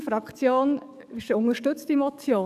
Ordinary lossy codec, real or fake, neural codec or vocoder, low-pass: none; fake; codec, 44.1 kHz, 7.8 kbps, DAC; 14.4 kHz